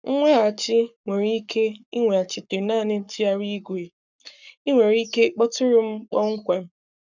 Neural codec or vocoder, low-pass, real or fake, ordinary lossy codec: codec, 44.1 kHz, 7.8 kbps, Pupu-Codec; 7.2 kHz; fake; none